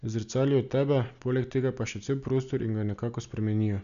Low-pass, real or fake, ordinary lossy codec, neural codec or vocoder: 7.2 kHz; real; none; none